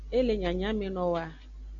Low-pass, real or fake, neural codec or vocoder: 7.2 kHz; real; none